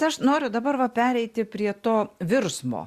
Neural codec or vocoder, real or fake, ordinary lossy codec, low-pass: none; real; Opus, 64 kbps; 14.4 kHz